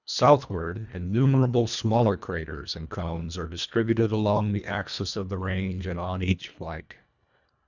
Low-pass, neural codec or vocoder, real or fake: 7.2 kHz; codec, 24 kHz, 1.5 kbps, HILCodec; fake